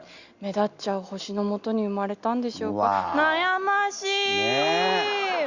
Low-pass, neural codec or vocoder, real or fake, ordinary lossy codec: 7.2 kHz; none; real; Opus, 64 kbps